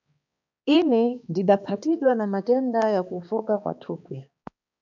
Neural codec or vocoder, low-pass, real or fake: codec, 16 kHz, 2 kbps, X-Codec, HuBERT features, trained on balanced general audio; 7.2 kHz; fake